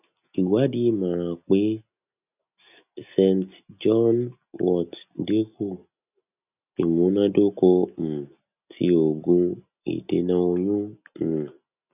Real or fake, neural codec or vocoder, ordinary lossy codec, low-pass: real; none; none; 3.6 kHz